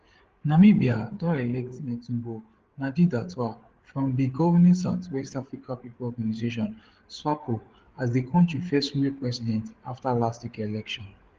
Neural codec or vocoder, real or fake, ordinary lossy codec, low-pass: codec, 16 kHz, 8 kbps, FreqCodec, larger model; fake; Opus, 16 kbps; 7.2 kHz